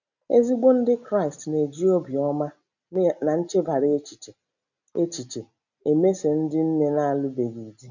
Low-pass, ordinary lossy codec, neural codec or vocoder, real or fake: 7.2 kHz; none; none; real